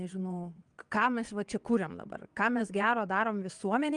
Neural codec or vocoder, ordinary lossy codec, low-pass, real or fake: vocoder, 22.05 kHz, 80 mel bands, WaveNeXt; Opus, 24 kbps; 9.9 kHz; fake